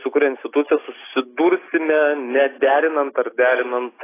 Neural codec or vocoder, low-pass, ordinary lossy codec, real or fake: none; 3.6 kHz; AAC, 16 kbps; real